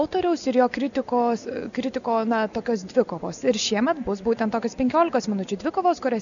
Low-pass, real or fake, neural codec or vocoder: 7.2 kHz; real; none